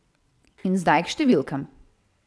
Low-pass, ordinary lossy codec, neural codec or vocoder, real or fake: none; none; vocoder, 22.05 kHz, 80 mel bands, WaveNeXt; fake